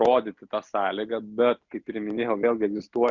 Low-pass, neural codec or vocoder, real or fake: 7.2 kHz; none; real